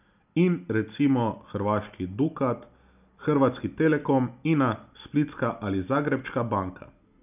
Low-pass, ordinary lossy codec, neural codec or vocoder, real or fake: 3.6 kHz; none; none; real